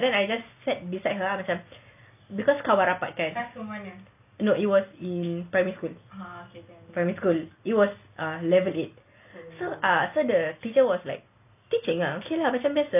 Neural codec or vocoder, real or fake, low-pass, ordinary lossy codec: none; real; 3.6 kHz; none